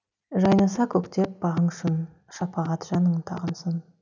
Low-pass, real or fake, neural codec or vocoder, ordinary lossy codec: 7.2 kHz; real; none; none